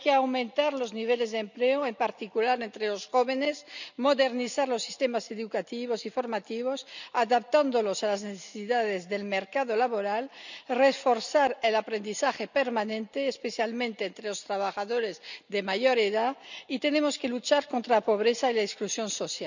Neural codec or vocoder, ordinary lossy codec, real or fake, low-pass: none; none; real; 7.2 kHz